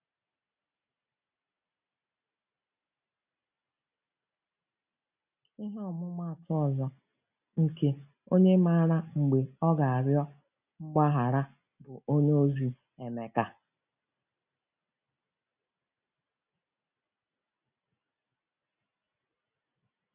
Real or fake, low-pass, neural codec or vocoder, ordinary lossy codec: real; 3.6 kHz; none; none